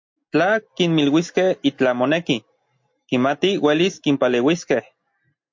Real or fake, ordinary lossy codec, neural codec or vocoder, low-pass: real; MP3, 48 kbps; none; 7.2 kHz